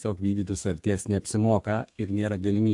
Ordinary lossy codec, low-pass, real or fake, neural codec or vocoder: AAC, 64 kbps; 10.8 kHz; fake; codec, 32 kHz, 1.9 kbps, SNAC